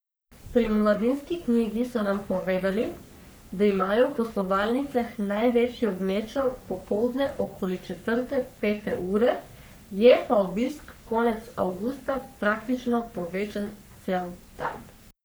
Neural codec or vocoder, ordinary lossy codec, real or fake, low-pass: codec, 44.1 kHz, 3.4 kbps, Pupu-Codec; none; fake; none